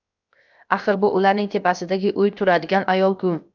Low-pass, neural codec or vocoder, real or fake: 7.2 kHz; codec, 16 kHz, 0.7 kbps, FocalCodec; fake